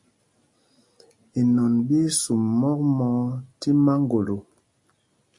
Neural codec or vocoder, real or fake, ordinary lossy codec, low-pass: none; real; MP3, 48 kbps; 10.8 kHz